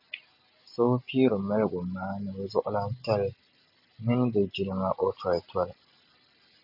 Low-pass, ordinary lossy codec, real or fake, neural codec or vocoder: 5.4 kHz; AAC, 48 kbps; real; none